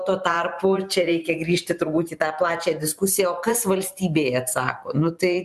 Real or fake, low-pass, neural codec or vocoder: fake; 14.4 kHz; vocoder, 44.1 kHz, 128 mel bands every 512 samples, BigVGAN v2